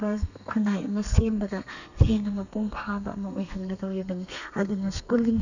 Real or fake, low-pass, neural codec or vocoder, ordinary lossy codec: fake; 7.2 kHz; codec, 32 kHz, 1.9 kbps, SNAC; none